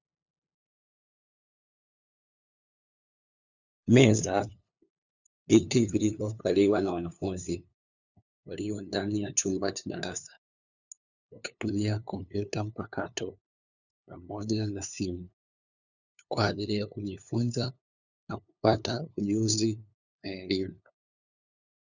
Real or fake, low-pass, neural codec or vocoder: fake; 7.2 kHz; codec, 16 kHz, 2 kbps, FunCodec, trained on LibriTTS, 25 frames a second